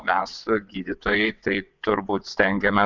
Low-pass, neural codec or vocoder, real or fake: 7.2 kHz; vocoder, 22.05 kHz, 80 mel bands, WaveNeXt; fake